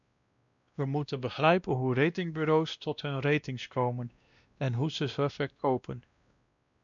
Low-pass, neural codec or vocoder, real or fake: 7.2 kHz; codec, 16 kHz, 1 kbps, X-Codec, WavLM features, trained on Multilingual LibriSpeech; fake